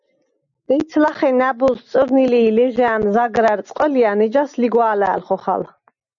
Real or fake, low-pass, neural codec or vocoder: real; 7.2 kHz; none